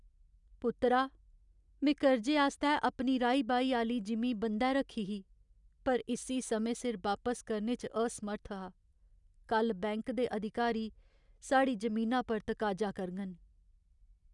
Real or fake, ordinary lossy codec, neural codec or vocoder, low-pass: real; none; none; 10.8 kHz